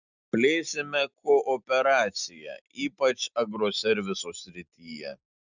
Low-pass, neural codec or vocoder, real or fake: 7.2 kHz; none; real